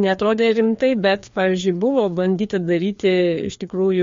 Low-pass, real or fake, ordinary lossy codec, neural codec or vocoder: 7.2 kHz; fake; MP3, 48 kbps; codec, 16 kHz, 2 kbps, FreqCodec, larger model